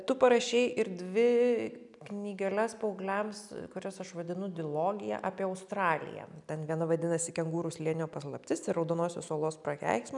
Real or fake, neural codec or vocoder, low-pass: real; none; 10.8 kHz